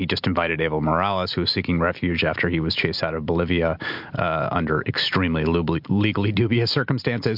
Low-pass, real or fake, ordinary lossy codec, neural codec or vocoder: 5.4 kHz; real; MP3, 48 kbps; none